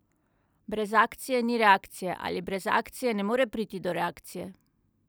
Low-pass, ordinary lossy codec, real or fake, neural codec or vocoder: none; none; real; none